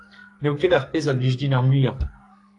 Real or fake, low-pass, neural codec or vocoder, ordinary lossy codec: fake; 10.8 kHz; codec, 44.1 kHz, 2.6 kbps, SNAC; AAC, 48 kbps